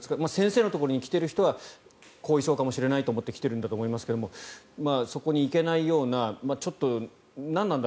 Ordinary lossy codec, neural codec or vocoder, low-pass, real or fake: none; none; none; real